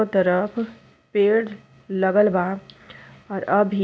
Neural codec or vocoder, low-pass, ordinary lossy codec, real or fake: none; none; none; real